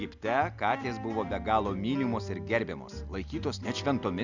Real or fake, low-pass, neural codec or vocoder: real; 7.2 kHz; none